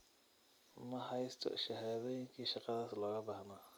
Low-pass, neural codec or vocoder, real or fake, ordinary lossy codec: none; vocoder, 44.1 kHz, 128 mel bands every 256 samples, BigVGAN v2; fake; none